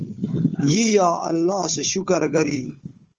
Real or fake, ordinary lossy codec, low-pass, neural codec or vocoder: fake; Opus, 24 kbps; 7.2 kHz; codec, 16 kHz, 4 kbps, FunCodec, trained on Chinese and English, 50 frames a second